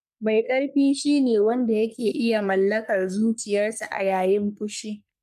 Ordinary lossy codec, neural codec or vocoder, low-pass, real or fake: none; codec, 44.1 kHz, 3.4 kbps, Pupu-Codec; 14.4 kHz; fake